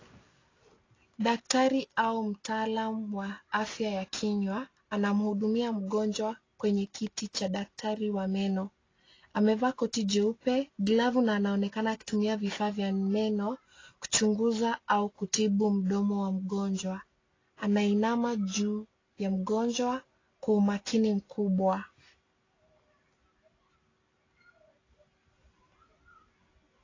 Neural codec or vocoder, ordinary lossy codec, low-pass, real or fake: none; AAC, 32 kbps; 7.2 kHz; real